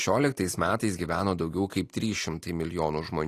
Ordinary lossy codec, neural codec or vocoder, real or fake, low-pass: AAC, 48 kbps; none; real; 14.4 kHz